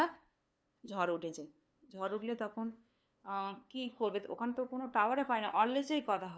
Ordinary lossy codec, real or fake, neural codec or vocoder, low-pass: none; fake; codec, 16 kHz, 2 kbps, FunCodec, trained on LibriTTS, 25 frames a second; none